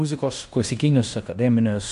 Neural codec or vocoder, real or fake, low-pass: codec, 16 kHz in and 24 kHz out, 0.9 kbps, LongCat-Audio-Codec, fine tuned four codebook decoder; fake; 10.8 kHz